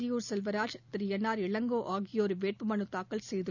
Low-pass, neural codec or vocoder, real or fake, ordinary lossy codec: 7.2 kHz; none; real; none